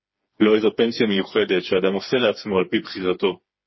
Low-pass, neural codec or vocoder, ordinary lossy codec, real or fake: 7.2 kHz; codec, 16 kHz, 4 kbps, FreqCodec, smaller model; MP3, 24 kbps; fake